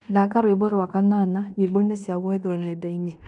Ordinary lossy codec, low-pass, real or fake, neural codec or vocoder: none; 10.8 kHz; fake; codec, 16 kHz in and 24 kHz out, 0.9 kbps, LongCat-Audio-Codec, fine tuned four codebook decoder